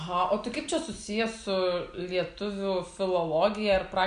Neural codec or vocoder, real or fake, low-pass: none; real; 9.9 kHz